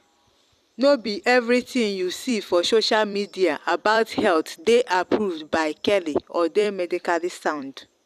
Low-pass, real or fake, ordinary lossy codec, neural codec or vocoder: 14.4 kHz; fake; none; vocoder, 44.1 kHz, 128 mel bands every 256 samples, BigVGAN v2